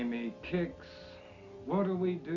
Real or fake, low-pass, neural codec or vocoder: real; 7.2 kHz; none